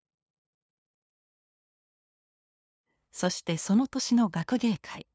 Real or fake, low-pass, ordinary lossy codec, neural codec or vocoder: fake; none; none; codec, 16 kHz, 2 kbps, FunCodec, trained on LibriTTS, 25 frames a second